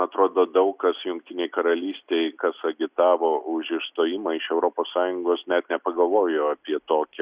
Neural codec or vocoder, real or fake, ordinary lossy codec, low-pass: none; real; Opus, 64 kbps; 3.6 kHz